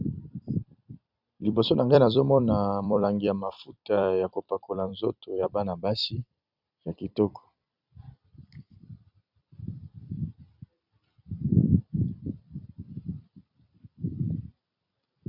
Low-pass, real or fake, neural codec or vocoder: 5.4 kHz; fake; vocoder, 44.1 kHz, 128 mel bands every 256 samples, BigVGAN v2